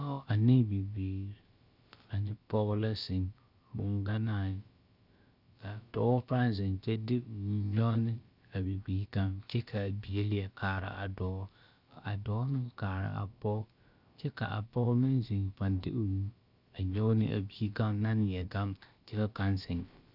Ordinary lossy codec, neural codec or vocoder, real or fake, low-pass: Opus, 64 kbps; codec, 16 kHz, about 1 kbps, DyCAST, with the encoder's durations; fake; 5.4 kHz